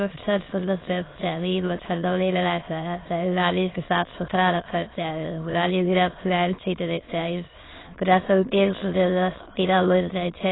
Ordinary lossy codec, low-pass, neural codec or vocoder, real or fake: AAC, 16 kbps; 7.2 kHz; autoencoder, 22.05 kHz, a latent of 192 numbers a frame, VITS, trained on many speakers; fake